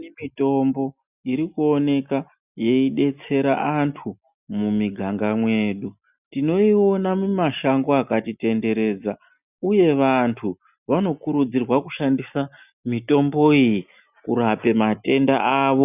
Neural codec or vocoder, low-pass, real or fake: none; 3.6 kHz; real